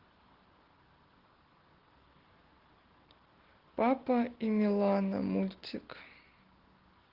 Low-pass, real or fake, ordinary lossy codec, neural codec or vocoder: 5.4 kHz; real; Opus, 16 kbps; none